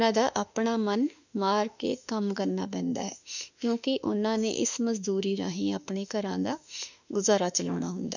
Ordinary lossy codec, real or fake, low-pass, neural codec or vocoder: none; fake; 7.2 kHz; autoencoder, 48 kHz, 32 numbers a frame, DAC-VAE, trained on Japanese speech